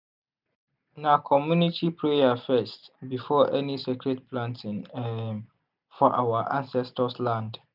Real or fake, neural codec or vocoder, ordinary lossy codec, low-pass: real; none; none; 5.4 kHz